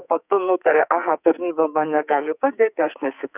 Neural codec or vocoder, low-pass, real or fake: codec, 44.1 kHz, 2.6 kbps, SNAC; 3.6 kHz; fake